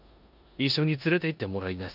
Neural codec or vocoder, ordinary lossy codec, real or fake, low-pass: codec, 16 kHz in and 24 kHz out, 0.9 kbps, LongCat-Audio-Codec, four codebook decoder; none; fake; 5.4 kHz